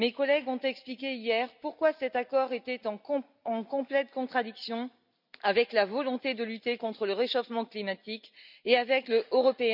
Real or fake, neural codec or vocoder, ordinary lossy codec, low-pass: real; none; none; 5.4 kHz